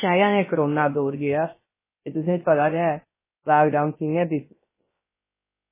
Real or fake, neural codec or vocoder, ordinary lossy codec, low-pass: fake; codec, 16 kHz, 0.3 kbps, FocalCodec; MP3, 16 kbps; 3.6 kHz